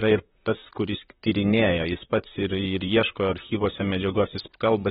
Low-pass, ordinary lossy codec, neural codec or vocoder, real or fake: 19.8 kHz; AAC, 16 kbps; vocoder, 44.1 kHz, 128 mel bands, Pupu-Vocoder; fake